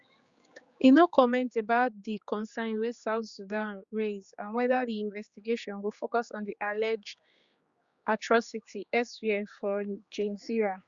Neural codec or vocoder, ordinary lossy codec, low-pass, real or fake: codec, 16 kHz, 4 kbps, X-Codec, HuBERT features, trained on general audio; Opus, 64 kbps; 7.2 kHz; fake